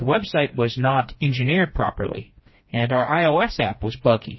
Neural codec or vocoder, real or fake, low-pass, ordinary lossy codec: codec, 16 kHz, 2 kbps, FreqCodec, smaller model; fake; 7.2 kHz; MP3, 24 kbps